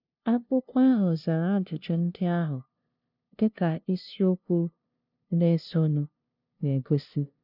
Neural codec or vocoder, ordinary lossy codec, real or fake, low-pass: codec, 16 kHz, 0.5 kbps, FunCodec, trained on LibriTTS, 25 frames a second; none; fake; 5.4 kHz